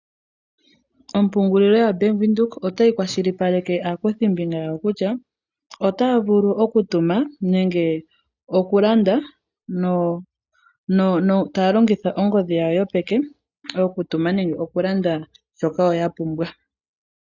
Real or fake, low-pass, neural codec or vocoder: real; 7.2 kHz; none